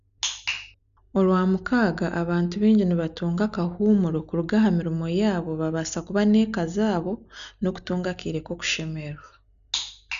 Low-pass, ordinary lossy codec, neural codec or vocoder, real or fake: 7.2 kHz; none; none; real